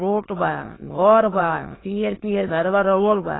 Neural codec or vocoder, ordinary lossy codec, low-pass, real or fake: autoencoder, 22.05 kHz, a latent of 192 numbers a frame, VITS, trained on many speakers; AAC, 16 kbps; 7.2 kHz; fake